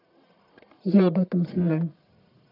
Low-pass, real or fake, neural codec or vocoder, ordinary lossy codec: 5.4 kHz; fake; codec, 44.1 kHz, 1.7 kbps, Pupu-Codec; none